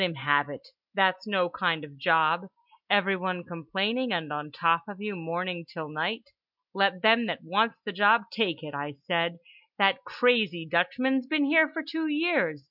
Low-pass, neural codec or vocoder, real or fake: 5.4 kHz; none; real